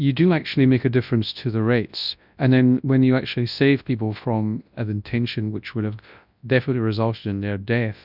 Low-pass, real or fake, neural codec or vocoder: 5.4 kHz; fake; codec, 24 kHz, 0.9 kbps, WavTokenizer, large speech release